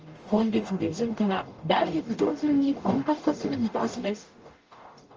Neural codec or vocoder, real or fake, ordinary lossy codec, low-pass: codec, 44.1 kHz, 0.9 kbps, DAC; fake; Opus, 24 kbps; 7.2 kHz